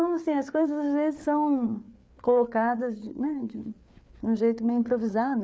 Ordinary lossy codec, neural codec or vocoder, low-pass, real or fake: none; codec, 16 kHz, 4 kbps, FreqCodec, larger model; none; fake